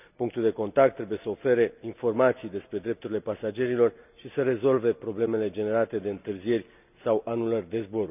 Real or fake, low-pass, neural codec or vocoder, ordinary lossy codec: real; 3.6 kHz; none; none